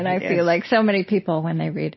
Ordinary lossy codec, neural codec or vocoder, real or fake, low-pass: MP3, 24 kbps; none; real; 7.2 kHz